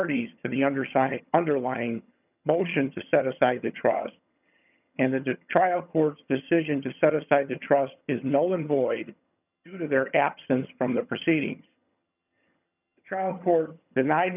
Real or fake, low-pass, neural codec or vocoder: fake; 3.6 kHz; vocoder, 22.05 kHz, 80 mel bands, HiFi-GAN